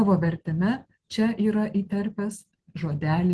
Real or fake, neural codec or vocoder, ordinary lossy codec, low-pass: real; none; Opus, 16 kbps; 10.8 kHz